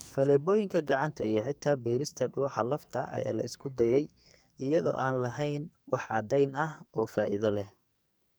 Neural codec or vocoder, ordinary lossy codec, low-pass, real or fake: codec, 44.1 kHz, 2.6 kbps, SNAC; none; none; fake